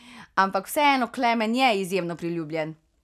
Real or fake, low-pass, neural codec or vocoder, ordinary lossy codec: real; 14.4 kHz; none; none